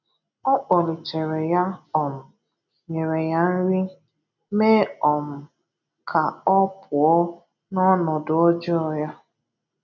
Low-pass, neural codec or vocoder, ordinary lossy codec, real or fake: 7.2 kHz; none; none; real